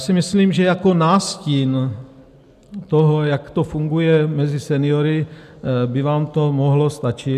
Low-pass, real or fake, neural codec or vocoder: 14.4 kHz; real; none